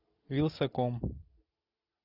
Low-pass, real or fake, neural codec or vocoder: 5.4 kHz; real; none